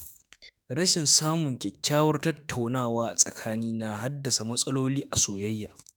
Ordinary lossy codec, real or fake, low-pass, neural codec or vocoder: none; fake; none; autoencoder, 48 kHz, 32 numbers a frame, DAC-VAE, trained on Japanese speech